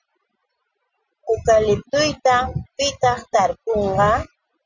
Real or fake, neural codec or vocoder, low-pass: real; none; 7.2 kHz